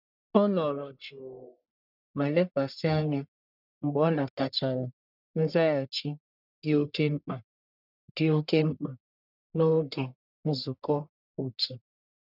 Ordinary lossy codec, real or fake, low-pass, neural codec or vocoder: none; fake; 5.4 kHz; codec, 44.1 kHz, 1.7 kbps, Pupu-Codec